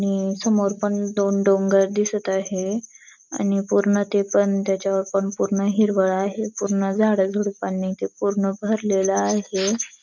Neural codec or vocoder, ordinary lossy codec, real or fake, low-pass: none; none; real; 7.2 kHz